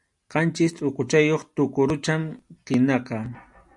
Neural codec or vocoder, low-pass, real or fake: none; 10.8 kHz; real